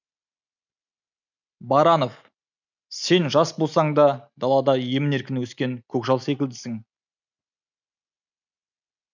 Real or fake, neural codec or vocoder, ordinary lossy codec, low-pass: real; none; none; 7.2 kHz